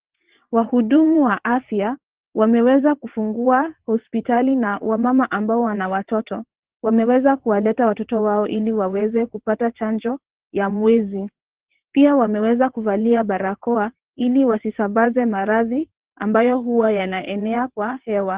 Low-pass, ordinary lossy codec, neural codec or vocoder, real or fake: 3.6 kHz; Opus, 16 kbps; vocoder, 22.05 kHz, 80 mel bands, WaveNeXt; fake